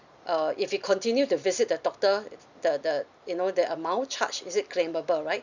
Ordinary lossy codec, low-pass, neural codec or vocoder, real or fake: none; 7.2 kHz; vocoder, 44.1 kHz, 128 mel bands every 256 samples, BigVGAN v2; fake